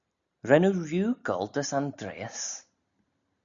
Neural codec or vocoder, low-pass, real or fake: none; 7.2 kHz; real